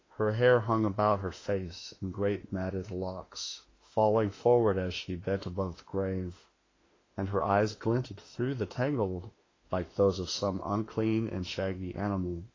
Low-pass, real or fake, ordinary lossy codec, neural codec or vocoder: 7.2 kHz; fake; AAC, 32 kbps; autoencoder, 48 kHz, 32 numbers a frame, DAC-VAE, trained on Japanese speech